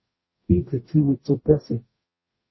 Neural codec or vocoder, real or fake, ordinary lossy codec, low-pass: codec, 44.1 kHz, 0.9 kbps, DAC; fake; MP3, 24 kbps; 7.2 kHz